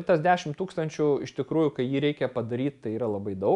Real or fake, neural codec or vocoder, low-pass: real; none; 10.8 kHz